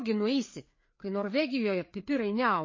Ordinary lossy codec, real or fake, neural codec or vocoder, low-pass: MP3, 32 kbps; fake; codec, 16 kHz, 4 kbps, FreqCodec, larger model; 7.2 kHz